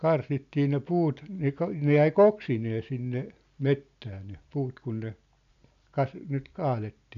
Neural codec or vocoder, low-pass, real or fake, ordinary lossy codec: none; 7.2 kHz; real; none